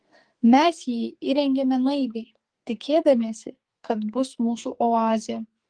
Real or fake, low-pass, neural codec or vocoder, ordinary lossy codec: fake; 9.9 kHz; codec, 44.1 kHz, 2.6 kbps, SNAC; Opus, 24 kbps